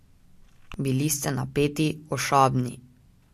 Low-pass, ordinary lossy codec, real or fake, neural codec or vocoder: 14.4 kHz; MP3, 64 kbps; real; none